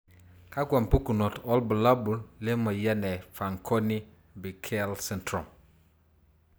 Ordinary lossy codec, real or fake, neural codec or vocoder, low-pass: none; real; none; none